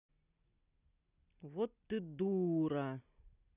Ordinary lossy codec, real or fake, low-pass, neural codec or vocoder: none; real; 3.6 kHz; none